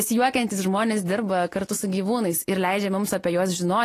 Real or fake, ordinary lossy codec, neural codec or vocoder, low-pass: real; AAC, 48 kbps; none; 14.4 kHz